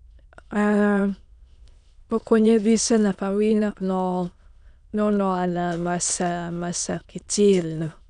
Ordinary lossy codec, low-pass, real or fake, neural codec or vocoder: none; 9.9 kHz; fake; autoencoder, 22.05 kHz, a latent of 192 numbers a frame, VITS, trained on many speakers